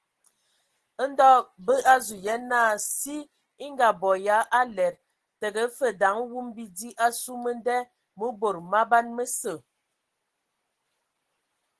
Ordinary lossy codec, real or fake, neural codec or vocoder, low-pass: Opus, 16 kbps; real; none; 10.8 kHz